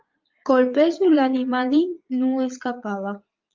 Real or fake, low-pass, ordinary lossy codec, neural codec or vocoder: fake; 7.2 kHz; Opus, 24 kbps; vocoder, 22.05 kHz, 80 mel bands, Vocos